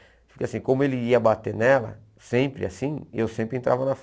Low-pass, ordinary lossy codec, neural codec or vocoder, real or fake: none; none; none; real